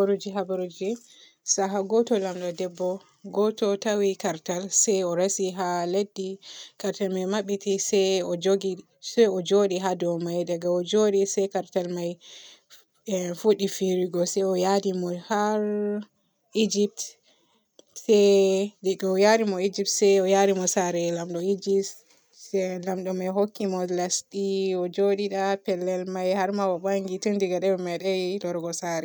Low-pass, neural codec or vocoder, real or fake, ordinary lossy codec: none; none; real; none